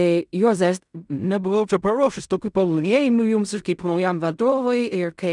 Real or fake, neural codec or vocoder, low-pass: fake; codec, 16 kHz in and 24 kHz out, 0.4 kbps, LongCat-Audio-Codec, fine tuned four codebook decoder; 10.8 kHz